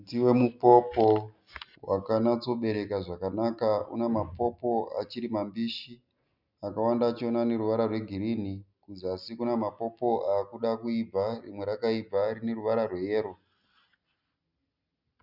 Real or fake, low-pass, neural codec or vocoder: real; 5.4 kHz; none